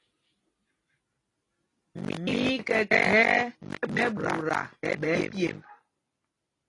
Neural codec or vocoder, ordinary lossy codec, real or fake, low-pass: none; AAC, 32 kbps; real; 10.8 kHz